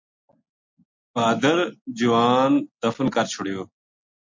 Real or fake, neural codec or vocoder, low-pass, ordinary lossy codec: real; none; 7.2 kHz; MP3, 48 kbps